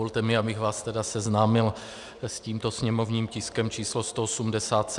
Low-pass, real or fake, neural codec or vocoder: 10.8 kHz; real; none